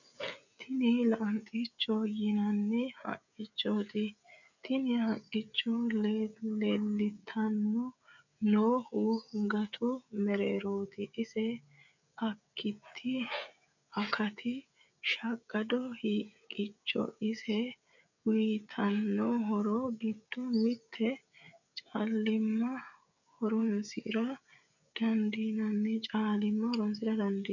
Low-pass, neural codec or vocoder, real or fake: 7.2 kHz; codec, 16 kHz, 16 kbps, FreqCodec, smaller model; fake